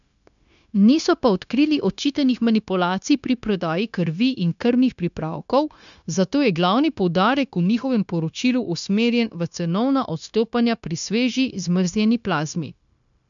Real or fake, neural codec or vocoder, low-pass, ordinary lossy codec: fake; codec, 16 kHz, 0.9 kbps, LongCat-Audio-Codec; 7.2 kHz; none